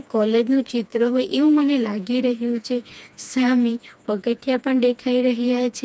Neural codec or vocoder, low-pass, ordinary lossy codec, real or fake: codec, 16 kHz, 2 kbps, FreqCodec, smaller model; none; none; fake